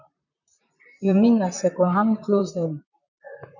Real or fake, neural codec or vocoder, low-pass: fake; vocoder, 44.1 kHz, 128 mel bands, Pupu-Vocoder; 7.2 kHz